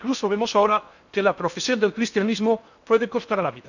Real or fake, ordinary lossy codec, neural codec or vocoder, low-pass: fake; none; codec, 16 kHz in and 24 kHz out, 0.8 kbps, FocalCodec, streaming, 65536 codes; 7.2 kHz